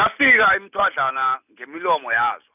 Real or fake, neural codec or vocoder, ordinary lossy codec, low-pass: real; none; none; 3.6 kHz